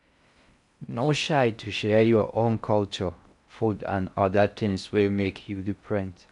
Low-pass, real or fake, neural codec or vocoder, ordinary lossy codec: 10.8 kHz; fake; codec, 16 kHz in and 24 kHz out, 0.6 kbps, FocalCodec, streaming, 4096 codes; none